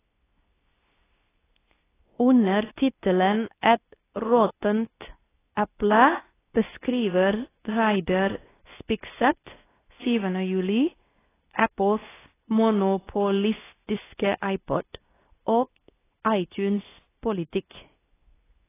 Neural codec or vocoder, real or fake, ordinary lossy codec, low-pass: codec, 24 kHz, 0.9 kbps, WavTokenizer, medium speech release version 2; fake; AAC, 16 kbps; 3.6 kHz